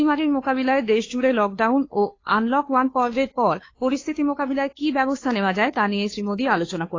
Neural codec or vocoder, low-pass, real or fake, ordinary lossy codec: codec, 16 kHz, 2 kbps, FunCodec, trained on Chinese and English, 25 frames a second; 7.2 kHz; fake; AAC, 32 kbps